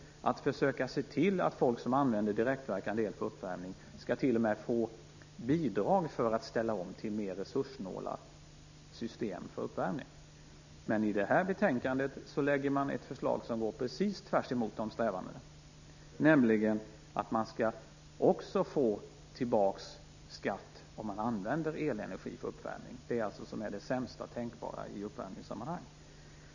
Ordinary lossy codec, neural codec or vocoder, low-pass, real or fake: none; none; 7.2 kHz; real